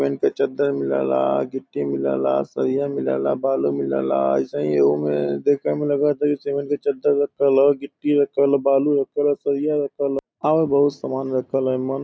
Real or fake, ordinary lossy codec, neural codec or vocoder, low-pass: real; none; none; none